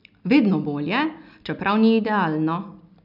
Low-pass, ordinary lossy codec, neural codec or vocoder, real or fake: 5.4 kHz; none; none; real